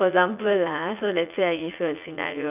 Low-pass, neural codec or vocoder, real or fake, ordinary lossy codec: 3.6 kHz; vocoder, 44.1 kHz, 80 mel bands, Vocos; fake; none